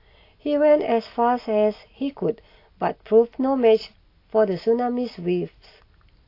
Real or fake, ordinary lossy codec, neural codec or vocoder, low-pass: real; AAC, 32 kbps; none; 5.4 kHz